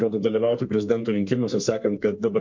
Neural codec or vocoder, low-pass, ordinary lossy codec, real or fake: codec, 32 kHz, 1.9 kbps, SNAC; 7.2 kHz; MP3, 48 kbps; fake